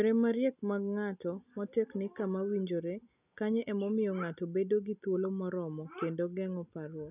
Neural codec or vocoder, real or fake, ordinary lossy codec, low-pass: none; real; none; 3.6 kHz